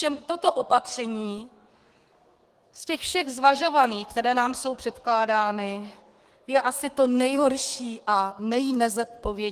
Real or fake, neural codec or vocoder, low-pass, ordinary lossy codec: fake; codec, 32 kHz, 1.9 kbps, SNAC; 14.4 kHz; Opus, 24 kbps